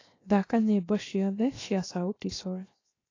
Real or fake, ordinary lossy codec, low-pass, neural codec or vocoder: fake; AAC, 32 kbps; 7.2 kHz; codec, 16 kHz, 0.7 kbps, FocalCodec